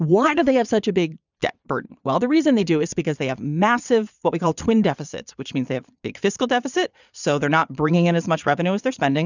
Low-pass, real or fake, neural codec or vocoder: 7.2 kHz; fake; vocoder, 22.05 kHz, 80 mel bands, Vocos